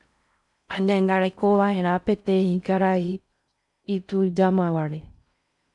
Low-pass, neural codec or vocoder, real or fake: 10.8 kHz; codec, 16 kHz in and 24 kHz out, 0.6 kbps, FocalCodec, streaming, 4096 codes; fake